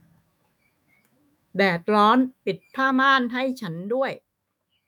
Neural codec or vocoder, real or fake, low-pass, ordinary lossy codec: autoencoder, 48 kHz, 128 numbers a frame, DAC-VAE, trained on Japanese speech; fake; 19.8 kHz; none